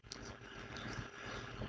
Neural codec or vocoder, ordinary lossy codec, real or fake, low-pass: codec, 16 kHz, 4.8 kbps, FACodec; none; fake; none